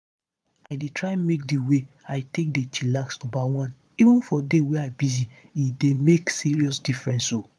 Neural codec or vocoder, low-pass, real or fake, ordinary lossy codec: vocoder, 22.05 kHz, 80 mel bands, Vocos; none; fake; none